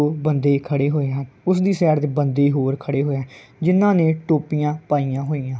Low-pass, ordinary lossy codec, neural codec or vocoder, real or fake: none; none; none; real